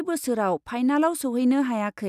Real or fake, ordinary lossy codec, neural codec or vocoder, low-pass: real; none; none; 14.4 kHz